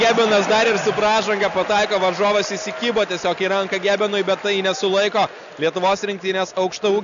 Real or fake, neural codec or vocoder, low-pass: real; none; 7.2 kHz